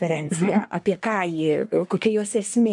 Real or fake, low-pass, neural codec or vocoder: fake; 10.8 kHz; codec, 24 kHz, 1 kbps, SNAC